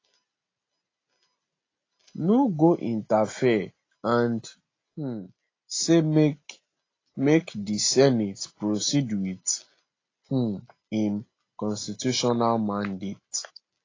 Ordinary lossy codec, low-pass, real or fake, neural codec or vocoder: AAC, 32 kbps; 7.2 kHz; real; none